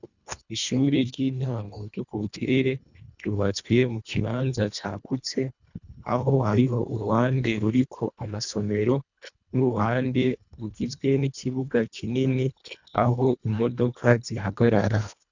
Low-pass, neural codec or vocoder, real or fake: 7.2 kHz; codec, 24 kHz, 1.5 kbps, HILCodec; fake